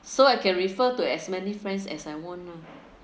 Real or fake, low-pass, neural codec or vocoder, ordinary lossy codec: real; none; none; none